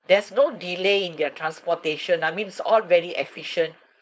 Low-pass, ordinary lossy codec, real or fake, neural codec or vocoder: none; none; fake; codec, 16 kHz, 4.8 kbps, FACodec